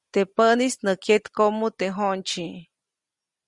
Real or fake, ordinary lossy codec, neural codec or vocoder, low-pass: real; Opus, 64 kbps; none; 10.8 kHz